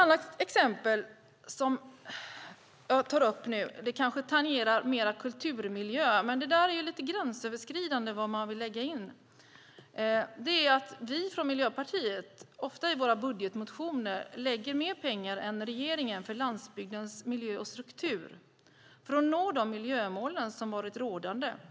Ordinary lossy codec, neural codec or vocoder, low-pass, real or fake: none; none; none; real